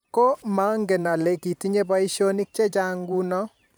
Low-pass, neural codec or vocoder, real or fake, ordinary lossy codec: none; vocoder, 44.1 kHz, 128 mel bands every 512 samples, BigVGAN v2; fake; none